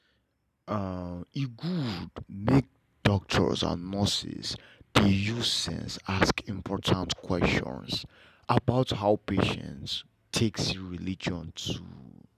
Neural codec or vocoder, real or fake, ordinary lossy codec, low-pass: none; real; none; 14.4 kHz